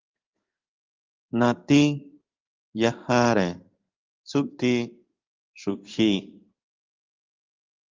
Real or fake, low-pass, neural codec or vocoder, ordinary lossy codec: fake; 7.2 kHz; codec, 16 kHz in and 24 kHz out, 1 kbps, XY-Tokenizer; Opus, 32 kbps